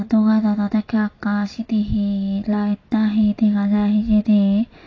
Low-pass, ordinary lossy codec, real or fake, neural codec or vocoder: 7.2 kHz; AAC, 32 kbps; real; none